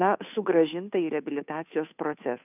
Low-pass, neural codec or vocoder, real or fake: 3.6 kHz; codec, 16 kHz, 2 kbps, FunCodec, trained on Chinese and English, 25 frames a second; fake